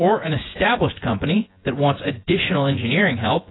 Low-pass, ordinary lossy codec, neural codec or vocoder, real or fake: 7.2 kHz; AAC, 16 kbps; vocoder, 24 kHz, 100 mel bands, Vocos; fake